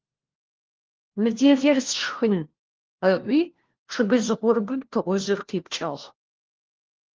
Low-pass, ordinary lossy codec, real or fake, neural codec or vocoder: 7.2 kHz; Opus, 16 kbps; fake; codec, 16 kHz, 1 kbps, FunCodec, trained on LibriTTS, 50 frames a second